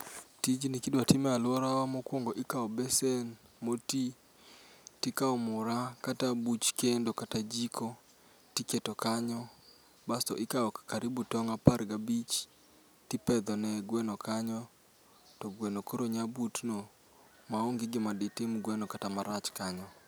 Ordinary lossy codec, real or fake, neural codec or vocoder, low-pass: none; real; none; none